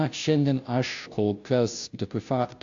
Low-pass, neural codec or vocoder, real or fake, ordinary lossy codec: 7.2 kHz; codec, 16 kHz, 0.5 kbps, FunCodec, trained on Chinese and English, 25 frames a second; fake; AAC, 64 kbps